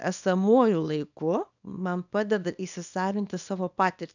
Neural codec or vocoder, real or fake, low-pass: codec, 24 kHz, 0.9 kbps, WavTokenizer, small release; fake; 7.2 kHz